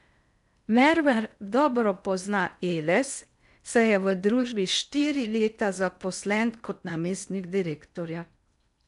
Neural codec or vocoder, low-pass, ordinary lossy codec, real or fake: codec, 16 kHz in and 24 kHz out, 0.6 kbps, FocalCodec, streaming, 2048 codes; 10.8 kHz; none; fake